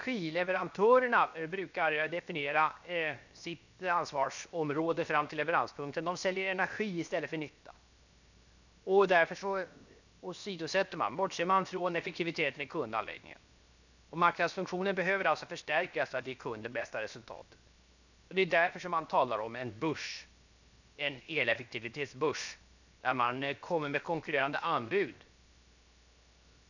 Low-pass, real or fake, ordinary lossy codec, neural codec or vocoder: 7.2 kHz; fake; none; codec, 16 kHz, 0.7 kbps, FocalCodec